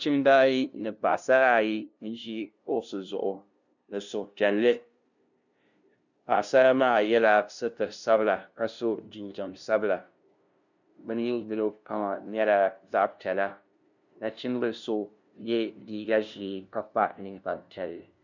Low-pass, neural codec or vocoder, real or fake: 7.2 kHz; codec, 16 kHz, 0.5 kbps, FunCodec, trained on LibriTTS, 25 frames a second; fake